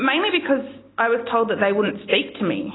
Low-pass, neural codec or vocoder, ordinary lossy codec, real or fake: 7.2 kHz; none; AAC, 16 kbps; real